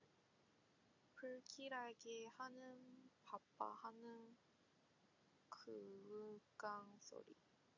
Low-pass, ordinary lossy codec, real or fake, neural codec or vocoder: 7.2 kHz; AAC, 48 kbps; real; none